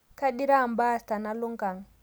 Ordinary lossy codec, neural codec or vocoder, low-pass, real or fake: none; none; none; real